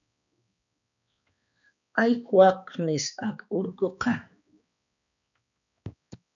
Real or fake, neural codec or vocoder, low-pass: fake; codec, 16 kHz, 2 kbps, X-Codec, HuBERT features, trained on balanced general audio; 7.2 kHz